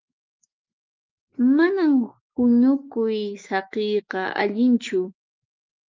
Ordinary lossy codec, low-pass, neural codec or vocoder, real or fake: Opus, 24 kbps; 7.2 kHz; autoencoder, 48 kHz, 128 numbers a frame, DAC-VAE, trained on Japanese speech; fake